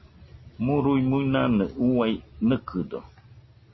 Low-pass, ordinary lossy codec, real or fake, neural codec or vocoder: 7.2 kHz; MP3, 24 kbps; real; none